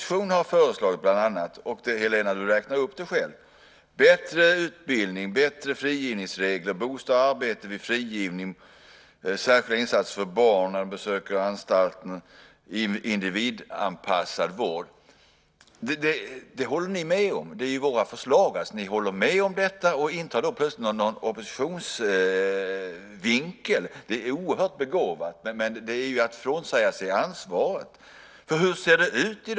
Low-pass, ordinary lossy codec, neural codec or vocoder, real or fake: none; none; none; real